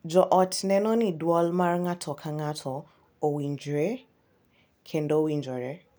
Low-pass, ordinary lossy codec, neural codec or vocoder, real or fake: none; none; none; real